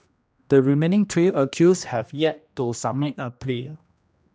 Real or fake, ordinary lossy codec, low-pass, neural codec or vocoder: fake; none; none; codec, 16 kHz, 1 kbps, X-Codec, HuBERT features, trained on general audio